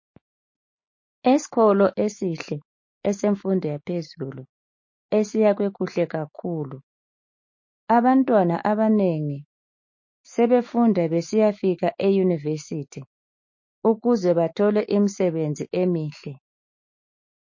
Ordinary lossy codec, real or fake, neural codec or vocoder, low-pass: MP3, 32 kbps; fake; autoencoder, 48 kHz, 128 numbers a frame, DAC-VAE, trained on Japanese speech; 7.2 kHz